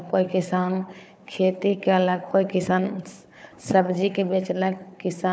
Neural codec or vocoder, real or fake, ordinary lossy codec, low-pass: codec, 16 kHz, 4 kbps, FunCodec, trained on Chinese and English, 50 frames a second; fake; none; none